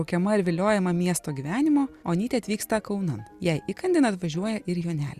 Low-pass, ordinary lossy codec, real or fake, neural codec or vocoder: 14.4 kHz; Opus, 64 kbps; real; none